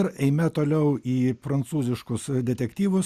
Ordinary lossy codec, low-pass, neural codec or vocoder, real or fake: Opus, 64 kbps; 14.4 kHz; none; real